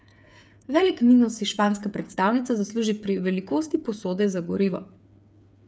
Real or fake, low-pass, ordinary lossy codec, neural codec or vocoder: fake; none; none; codec, 16 kHz, 8 kbps, FreqCodec, smaller model